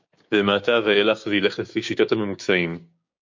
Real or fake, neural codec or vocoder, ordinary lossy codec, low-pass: fake; codec, 16 kHz, 6 kbps, DAC; MP3, 48 kbps; 7.2 kHz